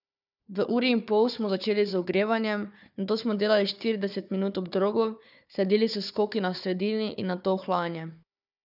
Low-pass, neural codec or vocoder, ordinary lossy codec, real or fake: 5.4 kHz; codec, 16 kHz, 4 kbps, FunCodec, trained on Chinese and English, 50 frames a second; none; fake